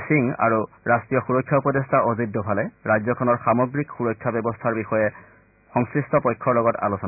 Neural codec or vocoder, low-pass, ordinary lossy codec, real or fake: none; 3.6 kHz; none; real